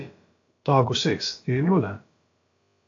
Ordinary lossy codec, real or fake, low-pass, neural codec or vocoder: AAC, 48 kbps; fake; 7.2 kHz; codec, 16 kHz, about 1 kbps, DyCAST, with the encoder's durations